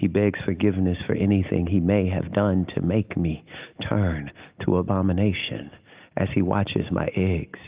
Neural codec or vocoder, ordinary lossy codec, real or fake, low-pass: none; Opus, 32 kbps; real; 3.6 kHz